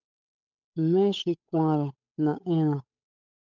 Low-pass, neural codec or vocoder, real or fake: 7.2 kHz; codec, 16 kHz, 8 kbps, FunCodec, trained on Chinese and English, 25 frames a second; fake